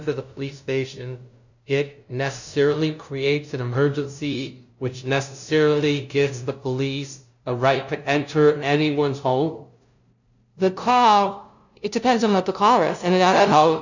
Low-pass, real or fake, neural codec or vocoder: 7.2 kHz; fake; codec, 16 kHz, 0.5 kbps, FunCodec, trained on LibriTTS, 25 frames a second